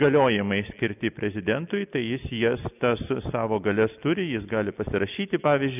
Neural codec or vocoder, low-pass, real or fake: none; 3.6 kHz; real